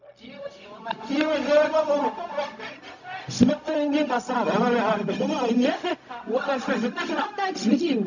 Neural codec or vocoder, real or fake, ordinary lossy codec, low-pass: codec, 16 kHz, 0.4 kbps, LongCat-Audio-Codec; fake; none; 7.2 kHz